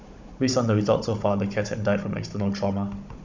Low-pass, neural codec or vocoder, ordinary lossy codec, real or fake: 7.2 kHz; codec, 16 kHz, 16 kbps, FunCodec, trained on Chinese and English, 50 frames a second; MP3, 64 kbps; fake